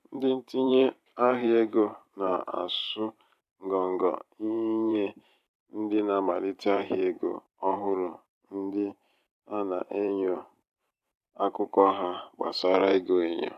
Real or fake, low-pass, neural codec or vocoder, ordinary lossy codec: fake; 14.4 kHz; vocoder, 44.1 kHz, 128 mel bands every 512 samples, BigVGAN v2; none